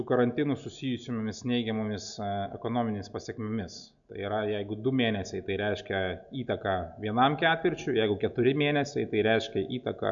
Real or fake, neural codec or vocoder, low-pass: real; none; 7.2 kHz